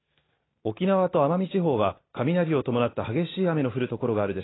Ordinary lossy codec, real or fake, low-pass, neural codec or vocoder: AAC, 16 kbps; real; 7.2 kHz; none